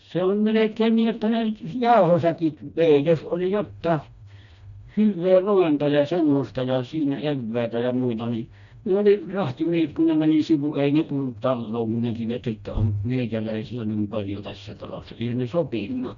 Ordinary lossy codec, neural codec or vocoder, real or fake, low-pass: none; codec, 16 kHz, 1 kbps, FreqCodec, smaller model; fake; 7.2 kHz